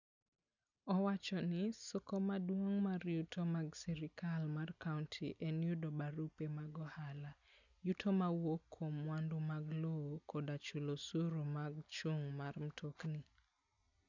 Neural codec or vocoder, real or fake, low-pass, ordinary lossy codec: none; real; 7.2 kHz; none